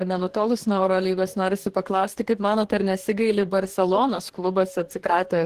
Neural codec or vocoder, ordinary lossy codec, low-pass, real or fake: codec, 44.1 kHz, 2.6 kbps, DAC; Opus, 16 kbps; 14.4 kHz; fake